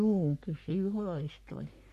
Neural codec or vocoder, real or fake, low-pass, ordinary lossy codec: codec, 44.1 kHz, 3.4 kbps, Pupu-Codec; fake; 14.4 kHz; MP3, 64 kbps